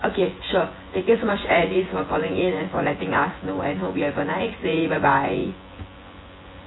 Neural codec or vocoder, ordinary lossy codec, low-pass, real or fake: vocoder, 24 kHz, 100 mel bands, Vocos; AAC, 16 kbps; 7.2 kHz; fake